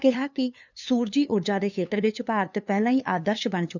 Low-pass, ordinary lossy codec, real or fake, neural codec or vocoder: 7.2 kHz; none; fake; codec, 16 kHz, 2 kbps, FunCodec, trained on LibriTTS, 25 frames a second